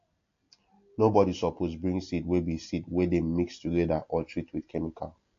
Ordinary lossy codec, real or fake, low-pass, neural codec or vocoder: AAC, 48 kbps; real; 7.2 kHz; none